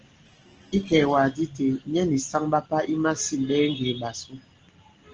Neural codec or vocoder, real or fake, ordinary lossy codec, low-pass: none; real; Opus, 16 kbps; 7.2 kHz